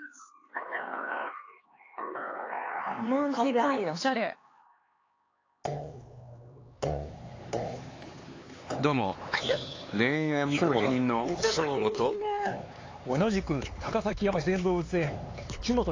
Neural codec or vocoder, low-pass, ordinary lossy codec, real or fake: codec, 16 kHz, 4 kbps, X-Codec, HuBERT features, trained on LibriSpeech; 7.2 kHz; AAC, 32 kbps; fake